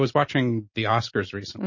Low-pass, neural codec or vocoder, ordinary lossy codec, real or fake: 7.2 kHz; none; MP3, 32 kbps; real